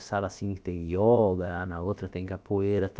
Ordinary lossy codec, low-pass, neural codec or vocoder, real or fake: none; none; codec, 16 kHz, about 1 kbps, DyCAST, with the encoder's durations; fake